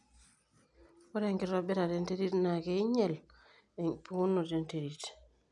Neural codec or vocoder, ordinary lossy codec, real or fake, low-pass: none; none; real; 10.8 kHz